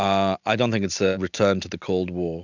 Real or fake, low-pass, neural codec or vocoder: real; 7.2 kHz; none